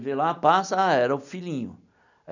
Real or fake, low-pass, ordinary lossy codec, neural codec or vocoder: real; 7.2 kHz; none; none